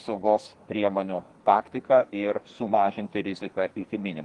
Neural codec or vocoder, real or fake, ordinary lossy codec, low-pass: codec, 44.1 kHz, 2.6 kbps, SNAC; fake; Opus, 24 kbps; 10.8 kHz